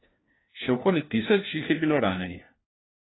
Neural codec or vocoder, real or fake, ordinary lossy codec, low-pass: codec, 16 kHz, 1 kbps, FunCodec, trained on LibriTTS, 50 frames a second; fake; AAC, 16 kbps; 7.2 kHz